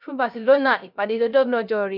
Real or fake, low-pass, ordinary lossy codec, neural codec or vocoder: fake; 5.4 kHz; none; codec, 16 kHz, 0.3 kbps, FocalCodec